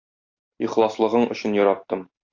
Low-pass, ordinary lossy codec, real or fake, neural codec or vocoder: 7.2 kHz; AAC, 48 kbps; real; none